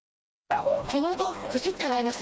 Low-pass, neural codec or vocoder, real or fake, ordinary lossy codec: none; codec, 16 kHz, 1 kbps, FreqCodec, smaller model; fake; none